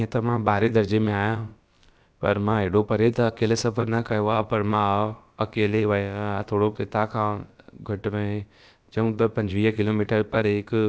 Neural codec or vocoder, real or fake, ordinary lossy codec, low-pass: codec, 16 kHz, about 1 kbps, DyCAST, with the encoder's durations; fake; none; none